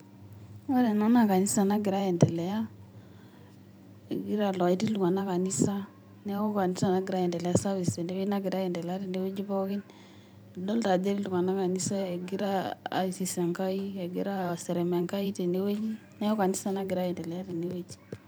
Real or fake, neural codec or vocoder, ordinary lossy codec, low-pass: fake; vocoder, 44.1 kHz, 128 mel bands every 512 samples, BigVGAN v2; none; none